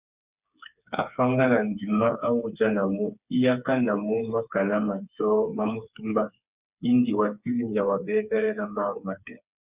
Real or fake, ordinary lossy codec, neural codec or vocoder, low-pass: fake; Opus, 64 kbps; codec, 16 kHz, 4 kbps, FreqCodec, smaller model; 3.6 kHz